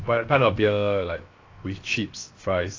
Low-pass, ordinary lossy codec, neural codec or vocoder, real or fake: 7.2 kHz; AAC, 32 kbps; codec, 16 kHz, 0.7 kbps, FocalCodec; fake